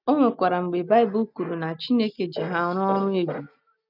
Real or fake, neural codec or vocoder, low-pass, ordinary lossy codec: real; none; 5.4 kHz; none